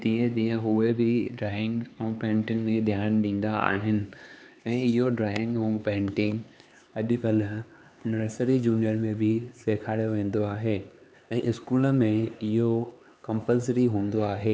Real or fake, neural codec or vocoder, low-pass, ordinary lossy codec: fake; codec, 16 kHz, 4 kbps, X-Codec, HuBERT features, trained on LibriSpeech; none; none